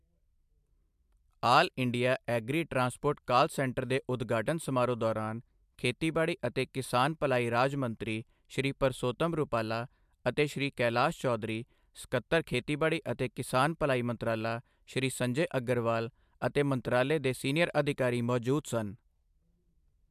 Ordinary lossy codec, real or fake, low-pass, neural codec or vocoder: MP3, 96 kbps; real; 14.4 kHz; none